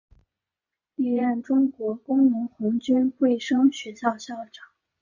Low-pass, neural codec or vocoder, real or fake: 7.2 kHz; vocoder, 24 kHz, 100 mel bands, Vocos; fake